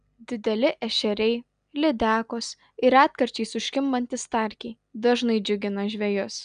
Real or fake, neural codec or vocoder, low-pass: real; none; 9.9 kHz